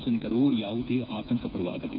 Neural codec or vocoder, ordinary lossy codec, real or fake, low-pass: codec, 16 kHz, 4 kbps, FreqCodec, smaller model; none; fake; 5.4 kHz